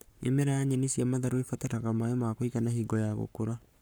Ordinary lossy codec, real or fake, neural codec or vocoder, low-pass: none; fake; codec, 44.1 kHz, 7.8 kbps, Pupu-Codec; none